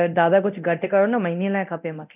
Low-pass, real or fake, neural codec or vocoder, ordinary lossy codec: 3.6 kHz; fake; codec, 24 kHz, 0.9 kbps, DualCodec; none